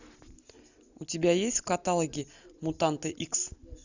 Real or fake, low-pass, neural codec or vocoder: real; 7.2 kHz; none